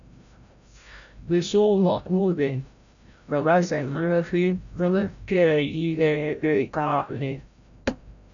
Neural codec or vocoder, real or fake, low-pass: codec, 16 kHz, 0.5 kbps, FreqCodec, larger model; fake; 7.2 kHz